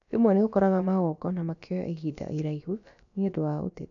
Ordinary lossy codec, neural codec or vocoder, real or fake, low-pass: none; codec, 16 kHz, about 1 kbps, DyCAST, with the encoder's durations; fake; 7.2 kHz